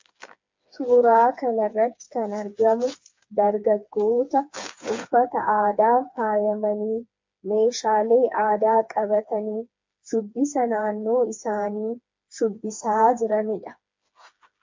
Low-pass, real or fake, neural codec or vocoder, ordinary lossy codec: 7.2 kHz; fake; codec, 16 kHz, 4 kbps, FreqCodec, smaller model; MP3, 48 kbps